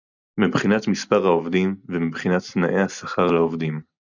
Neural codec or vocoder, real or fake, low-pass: none; real; 7.2 kHz